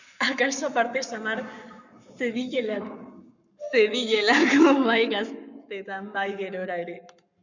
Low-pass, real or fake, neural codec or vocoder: 7.2 kHz; fake; codec, 44.1 kHz, 7.8 kbps, Pupu-Codec